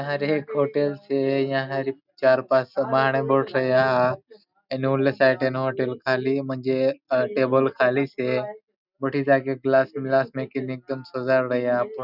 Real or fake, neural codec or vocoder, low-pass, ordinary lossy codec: real; none; 5.4 kHz; none